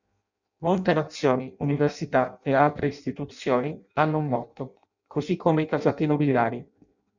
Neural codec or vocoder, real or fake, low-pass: codec, 16 kHz in and 24 kHz out, 0.6 kbps, FireRedTTS-2 codec; fake; 7.2 kHz